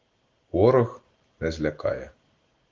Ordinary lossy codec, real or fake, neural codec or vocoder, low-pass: Opus, 16 kbps; real; none; 7.2 kHz